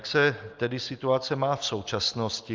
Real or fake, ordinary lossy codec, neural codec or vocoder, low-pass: real; Opus, 24 kbps; none; 7.2 kHz